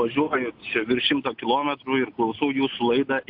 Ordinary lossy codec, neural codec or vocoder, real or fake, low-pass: AAC, 48 kbps; none; real; 5.4 kHz